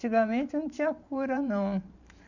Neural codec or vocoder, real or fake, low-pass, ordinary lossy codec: vocoder, 44.1 kHz, 128 mel bands every 512 samples, BigVGAN v2; fake; 7.2 kHz; none